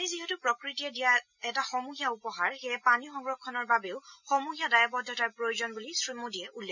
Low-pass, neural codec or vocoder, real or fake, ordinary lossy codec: 7.2 kHz; none; real; none